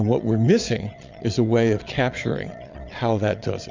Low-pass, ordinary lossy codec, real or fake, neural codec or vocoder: 7.2 kHz; MP3, 64 kbps; fake; vocoder, 22.05 kHz, 80 mel bands, Vocos